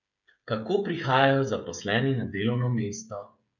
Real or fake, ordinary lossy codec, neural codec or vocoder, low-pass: fake; none; codec, 16 kHz, 8 kbps, FreqCodec, smaller model; 7.2 kHz